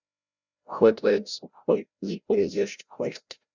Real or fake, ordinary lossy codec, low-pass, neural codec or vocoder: fake; Opus, 64 kbps; 7.2 kHz; codec, 16 kHz, 0.5 kbps, FreqCodec, larger model